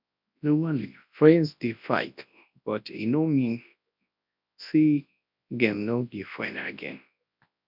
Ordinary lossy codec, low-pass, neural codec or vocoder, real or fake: none; 5.4 kHz; codec, 24 kHz, 0.9 kbps, WavTokenizer, large speech release; fake